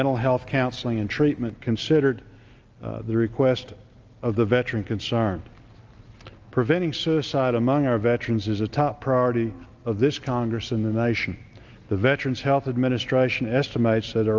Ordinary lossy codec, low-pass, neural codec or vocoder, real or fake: Opus, 24 kbps; 7.2 kHz; none; real